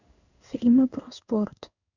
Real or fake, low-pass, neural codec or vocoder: fake; 7.2 kHz; codec, 16 kHz in and 24 kHz out, 1 kbps, XY-Tokenizer